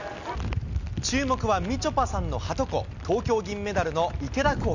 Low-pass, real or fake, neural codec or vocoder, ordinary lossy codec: 7.2 kHz; real; none; none